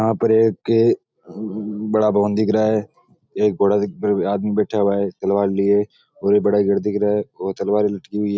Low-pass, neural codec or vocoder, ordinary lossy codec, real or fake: none; none; none; real